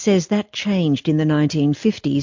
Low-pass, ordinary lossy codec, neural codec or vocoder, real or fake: 7.2 kHz; MP3, 48 kbps; none; real